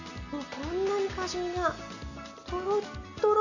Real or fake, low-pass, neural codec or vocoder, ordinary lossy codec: real; 7.2 kHz; none; none